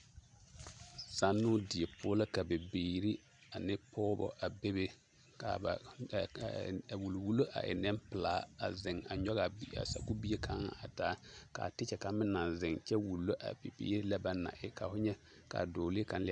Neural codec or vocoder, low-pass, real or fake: none; 9.9 kHz; real